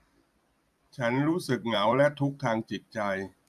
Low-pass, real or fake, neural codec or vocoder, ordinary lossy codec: 14.4 kHz; fake; vocoder, 44.1 kHz, 128 mel bands every 256 samples, BigVGAN v2; none